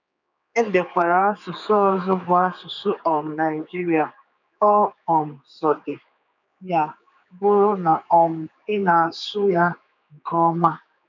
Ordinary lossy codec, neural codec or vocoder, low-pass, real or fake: none; codec, 16 kHz, 4 kbps, X-Codec, HuBERT features, trained on general audio; 7.2 kHz; fake